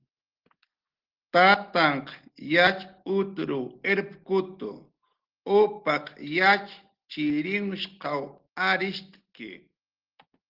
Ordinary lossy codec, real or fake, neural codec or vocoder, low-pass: Opus, 16 kbps; real; none; 5.4 kHz